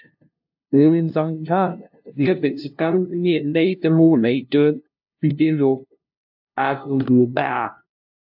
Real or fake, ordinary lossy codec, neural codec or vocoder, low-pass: fake; AAC, 48 kbps; codec, 16 kHz, 0.5 kbps, FunCodec, trained on LibriTTS, 25 frames a second; 5.4 kHz